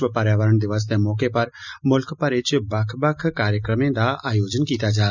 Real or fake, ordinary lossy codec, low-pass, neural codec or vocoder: real; none; 7.2 kHz; none